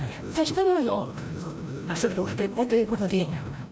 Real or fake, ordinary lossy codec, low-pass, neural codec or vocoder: fake; none; none; codec, 16 kHz, 0.5 kbps, FreqCodec, larger model